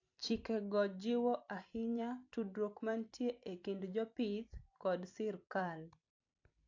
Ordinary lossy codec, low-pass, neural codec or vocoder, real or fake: AAC, 48 kbps; 7.2 kHz; vocoder, 44.1 kHz, 128 mel bands every 256 samples, BigVGAN v2; fake